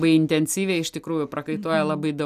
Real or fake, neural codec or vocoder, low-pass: real; none; 14.4 kHz